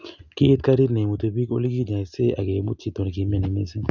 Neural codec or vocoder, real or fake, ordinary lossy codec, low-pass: none; real; none; 7.2 kHz